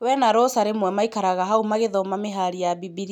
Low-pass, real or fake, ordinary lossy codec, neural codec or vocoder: 19.8 kHz; real; none; none